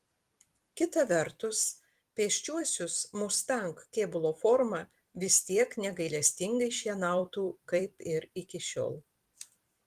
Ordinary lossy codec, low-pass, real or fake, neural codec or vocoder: Opus, 24 kbps; 14.4 kHz; fake; vocoder, 44.1 kHz, 128 mel bands every 256 samples, BigVGAN v2